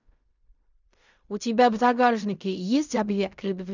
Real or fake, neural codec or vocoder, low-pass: fake; codec, 16 kHz in and 24 kHz out, 0.4 kbps, LongCat-Audio-Codec, fine tuned four codebook decoder; 7.2 kHz